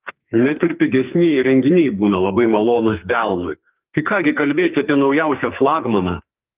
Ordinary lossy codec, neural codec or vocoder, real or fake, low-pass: Opus, 24 kbps; codec, 44.1 kHz, 2.6 kbps, SNAC; fake; 3.6 kHz